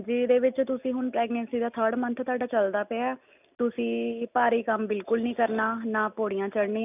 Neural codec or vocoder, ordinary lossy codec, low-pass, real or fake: none; none; 3.6 kHz; real